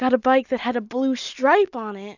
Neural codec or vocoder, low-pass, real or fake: none; 7.2 kHz; real